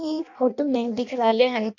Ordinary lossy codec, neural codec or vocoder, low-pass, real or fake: none; codec, 16 kHz in and 24 kHz out, 0.6 kbps, FireRedTTS-2 codec; 7.2 kHz; fake